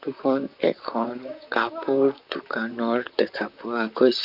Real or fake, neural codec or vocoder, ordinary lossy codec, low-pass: fake; vocoder, 44.1 kHz, 128 mel bands, Pupu-Vocoder; none; 5.4 kHz